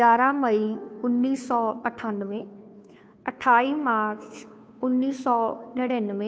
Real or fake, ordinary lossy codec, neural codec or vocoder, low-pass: fake; none; codec, 16 kHz, 2 kbps, FunCodec, trained on Chinese and English, 25 frames a second; none